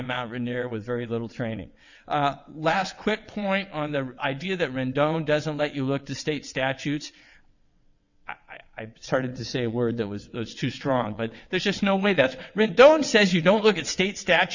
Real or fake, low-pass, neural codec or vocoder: fake; 7.2 kHz; vocoder, 22.05 kHz, 80 mel bands, WaveNeXt